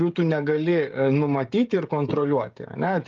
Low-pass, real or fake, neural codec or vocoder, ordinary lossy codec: 7.2 kHz; fake; codec, 16 kHz, 16 kbps, FreqCodec, smaller model; Opus, 24 kbps